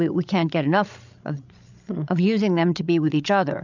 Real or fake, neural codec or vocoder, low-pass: fake; codec, 16 kHz, 16 kbps, FreqCodec, larger model; 7.2 kHz